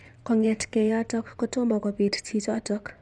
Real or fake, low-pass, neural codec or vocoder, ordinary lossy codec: fake; none; vocoder, 24 kHz, 100 mel bands, Vocos; none